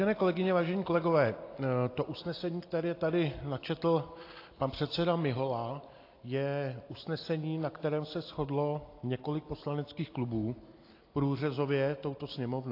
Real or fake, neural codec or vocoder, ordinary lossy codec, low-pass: real; none; AAC, 32 kbps; 5.4 kHz